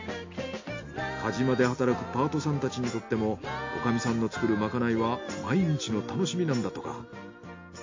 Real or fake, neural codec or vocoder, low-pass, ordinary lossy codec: real; none; 7.2 kHz; MP3, 48 kbps